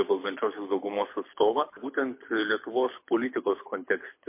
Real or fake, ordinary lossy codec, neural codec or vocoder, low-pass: fake; MP3, 24 kbps; codec, 24 kHz, 6 kbps, HILCodec; 3.6 kHz